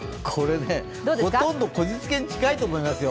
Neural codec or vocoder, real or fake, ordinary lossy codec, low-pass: none; real; none; none